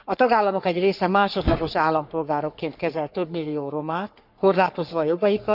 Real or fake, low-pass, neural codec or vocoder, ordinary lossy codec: fake; 5.4 kHz; codec, 44.1 kHz, 3.4 kbps, Pupu-Codec; none